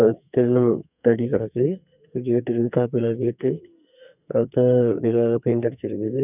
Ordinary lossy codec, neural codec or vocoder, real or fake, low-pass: none; codec, 44.1 kHz, 2.6 kbps, SNAC; fake; 3.6 kHz